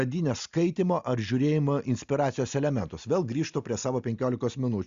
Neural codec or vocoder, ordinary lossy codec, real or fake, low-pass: none; Opus, 64 kbps; real; 7.2 kHz